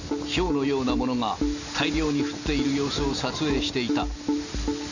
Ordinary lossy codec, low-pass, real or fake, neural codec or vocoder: none; 7.2 kHz; real; none